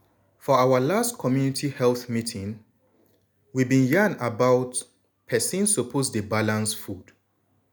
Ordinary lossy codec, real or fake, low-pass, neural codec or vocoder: none; real; none; none